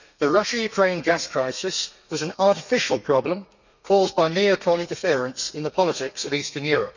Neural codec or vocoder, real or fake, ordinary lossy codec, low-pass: codec, 32 kHz, 1.9 kbps, SNAC; fake; none; 7.2 kHz